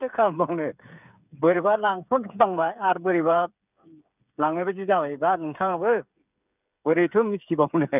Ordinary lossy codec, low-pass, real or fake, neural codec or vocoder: none; 3.6 kHz; fake; codec, 16 kHz, 8 kbps, FreqCodec, smaller model